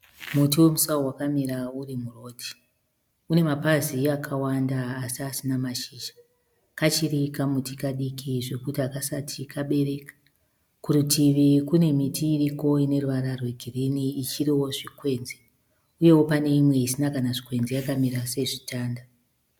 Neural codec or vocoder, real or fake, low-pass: none; real; 19.8 kHz